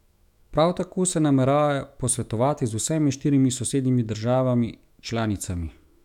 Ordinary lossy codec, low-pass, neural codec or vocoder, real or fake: none; 19.8 kHz; autoencoder, 48 kHz, 128 numbers a frame, DAC-VAE, trained on Japanese speech; fake